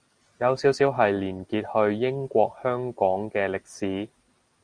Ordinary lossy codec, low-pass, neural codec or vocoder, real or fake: Opus, 32 kbps; 9.9 kHz; none; real